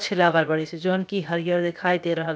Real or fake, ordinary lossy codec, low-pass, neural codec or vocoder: fake; none; none; codec, 16 kHz, 0.7 kbps, FocalCodec